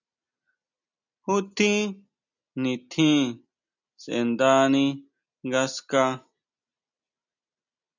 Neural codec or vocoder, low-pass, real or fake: none; 7.2 kHz; real